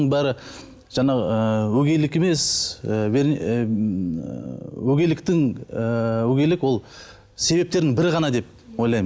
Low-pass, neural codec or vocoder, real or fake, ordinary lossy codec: none; none; real; none